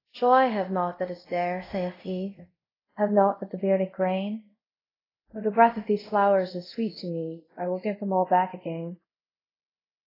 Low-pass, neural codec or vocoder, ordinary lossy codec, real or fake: 5.4 kHz; codec, 24 kHz, 0.5 kbps, DualCodec; AAC, 24 kbps; fake